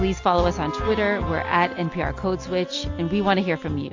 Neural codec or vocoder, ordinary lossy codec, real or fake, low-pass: none; AAC, 48 kbps; real; 7.2 kHz